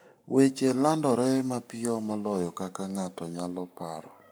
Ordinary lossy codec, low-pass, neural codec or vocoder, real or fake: none; none; codec, 44.1 kHz, 7.8 kbps, Pupu-Codec; fake